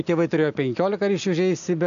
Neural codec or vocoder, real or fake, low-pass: none; real; 7.2 kHz